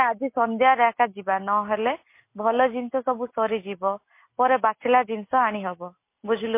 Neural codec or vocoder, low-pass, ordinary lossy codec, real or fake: none; 3.6 kHz; MP3, 24 kbps; real